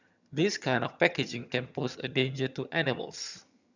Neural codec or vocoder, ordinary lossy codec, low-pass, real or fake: vocoder, 22.05 kHz, 80 mel bands, HiFi-GAN; none; 7.2 kHz; fake